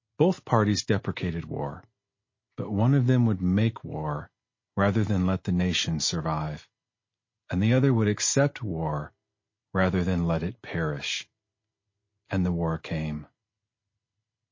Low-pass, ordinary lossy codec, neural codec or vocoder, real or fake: 7.2 kHz; MP3, 32 kbps; none; real